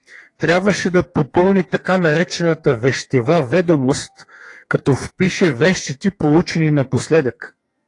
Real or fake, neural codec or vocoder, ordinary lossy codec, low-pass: fake; codec, 32 kHz, 1.9 kbps, SNAC; AAC, 48 kbps; 10.8 kHz